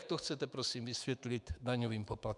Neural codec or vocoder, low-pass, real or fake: autoencoder, 48 kHz, 128 numbers a frame, DAC-VAE, trained on Japanese speech; 10.8 kHz; fake